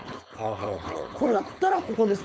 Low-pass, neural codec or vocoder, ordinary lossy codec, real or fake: none; codec, 16 kHz, 4.8 kbps, FACodec; none; fake